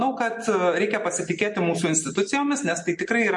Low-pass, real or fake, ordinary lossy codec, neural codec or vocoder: 10.8 kHz; real; MP3, 48 kbps; none